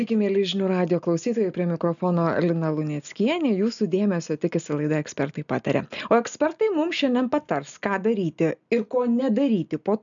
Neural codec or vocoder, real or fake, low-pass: none; real; 7.2 kHz